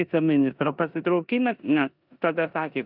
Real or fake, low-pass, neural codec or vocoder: fake; 5.4 kHz; codec, 16 kHz in and 24 kHz out, 0.9 kbps, LongCat-Audio-Codec, four codebook decoder